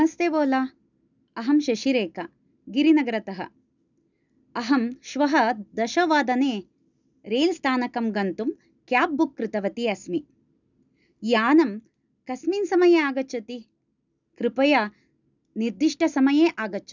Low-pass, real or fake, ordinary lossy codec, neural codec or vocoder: 7.2 kHz; real; none; none